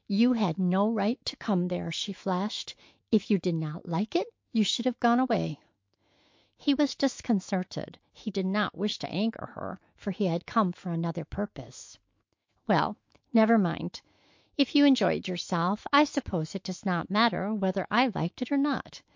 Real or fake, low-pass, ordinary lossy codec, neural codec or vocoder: fake; 7.2 kHz; MP3, 48 kbps; autoencoder, 48 kHz, 128 numbers a frame, DAC-VAE, trained on Japanese speech